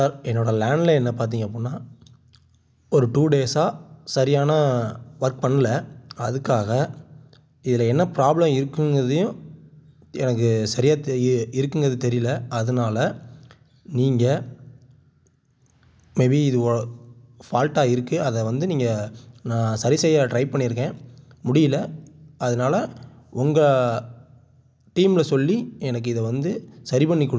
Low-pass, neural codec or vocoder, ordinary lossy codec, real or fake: none; none; none; real